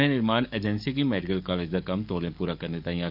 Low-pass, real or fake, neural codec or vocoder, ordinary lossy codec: 5.4 kHz; fake; codec, 44.1 kHz, 7.8 kbps, DAC; none